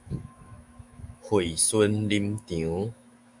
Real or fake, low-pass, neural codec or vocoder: fake; 10.8 kHz; autoencoder, 48 kHz, 128 numbers a frame, DAC-VAE, trained on Japanese speech